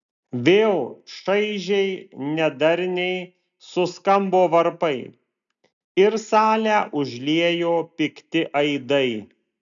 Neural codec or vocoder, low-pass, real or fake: none; 7.2 kHz; real